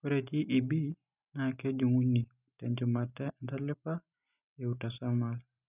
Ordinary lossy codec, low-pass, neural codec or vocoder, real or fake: none; 3.6 kHz; none; real